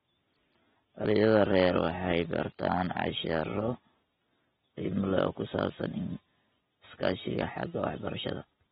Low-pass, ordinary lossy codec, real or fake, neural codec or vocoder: 14.4 kHz; AAC, 16 kbps; real; none